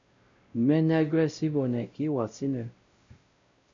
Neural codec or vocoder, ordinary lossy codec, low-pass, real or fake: codec, 16 kHz, 0.5 kbps, X-Codec, WavLM features, trained on Multilingual LibriSpeech; MP3, 64 kbps; 7.2 kHz; fake